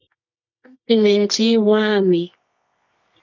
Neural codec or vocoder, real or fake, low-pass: codec, 24 kHz, 0.9 kbps, WavTokenizer, medium music audio release; fake; 7.2 kHz